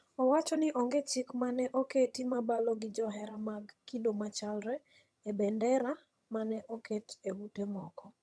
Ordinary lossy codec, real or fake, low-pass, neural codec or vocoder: none; fake; none; vocoder, 22.05 kHz, 80 mel bands, HiFi-GAN